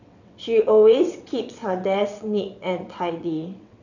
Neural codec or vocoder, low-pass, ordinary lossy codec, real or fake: vocoder, 22.05 kHz, 80 mel bands, WaveNeXt; 7.2 kHz; none; fake